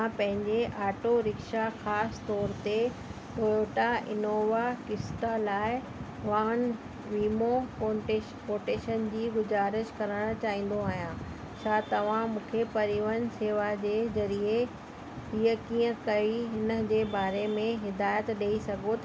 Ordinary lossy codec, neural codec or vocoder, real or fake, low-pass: none; none; real; none